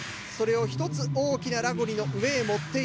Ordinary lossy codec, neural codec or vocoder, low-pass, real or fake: none; none; none; real